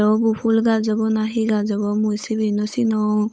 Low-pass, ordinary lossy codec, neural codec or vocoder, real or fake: none; none; codec, 16 kHz, 8 kbps, FunCodec, trained on Chinese and English, 25 frames a second; fake